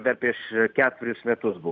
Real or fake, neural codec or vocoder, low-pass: real; none; 7.2 kHz